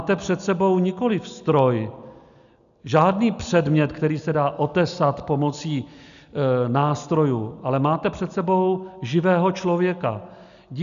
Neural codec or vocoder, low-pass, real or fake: none; 7.2 kHz; real